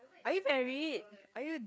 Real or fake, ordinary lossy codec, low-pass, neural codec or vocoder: fake; none; none; codec, 16 kHz, 4 kbps, FreqCodec, larger model